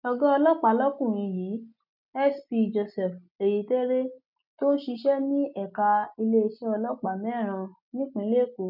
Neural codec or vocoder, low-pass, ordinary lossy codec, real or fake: none; 5.4 kHz; none; real